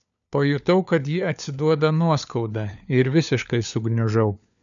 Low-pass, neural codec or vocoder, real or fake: 7.2 kHz; codec, 16 kHz, 4 kbps, FunCodec, trained on LibriTTS, 50 frames a second; fake